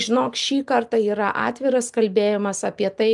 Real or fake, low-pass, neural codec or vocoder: real; 10.8 kHz; none